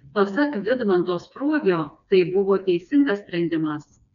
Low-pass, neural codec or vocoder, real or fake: 7.2 kHz; codec, 16 kHz, 2 kbps, FreqCodec, smaller model; fake